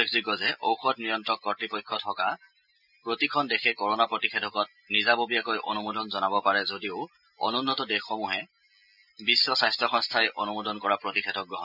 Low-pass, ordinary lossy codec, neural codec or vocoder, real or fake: 5.4 kHz; none; none; real